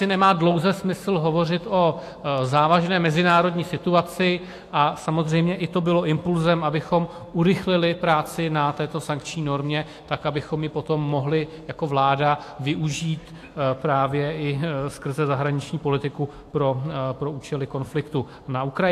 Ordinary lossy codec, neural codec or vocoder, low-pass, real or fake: AAC, 64 kbps; autoencoder, 48 kHz, 128 numbers a frame, DAC-VAE, trained on Japanese speech; 14.4 kHz; fake